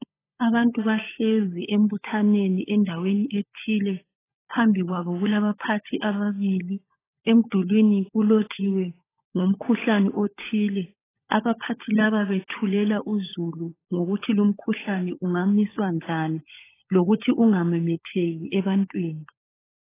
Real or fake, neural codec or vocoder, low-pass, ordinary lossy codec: fake; codec, 16 kHz, 16 kbps, FunCodec, trained on LibriTTS, 50 frames a second; 3.6 kHz; AAC, 16 kbps